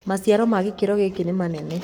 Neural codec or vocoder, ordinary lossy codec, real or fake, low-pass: codec, 44.1 kHz, 7.8 kbps, Pupu-Codec; none; fake; none